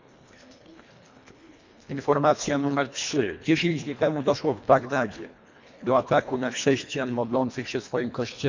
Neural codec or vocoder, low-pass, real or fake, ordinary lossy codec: codec, 24 kHz, 1.5 kbps, HILCodec; 7.2 kHz; fake; MP3, 64 kbps